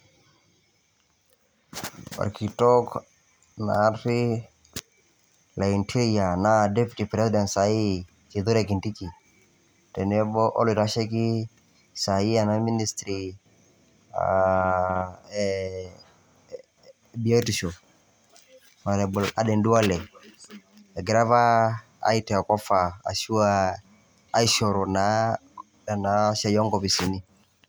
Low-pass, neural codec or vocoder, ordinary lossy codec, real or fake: none; none; none; real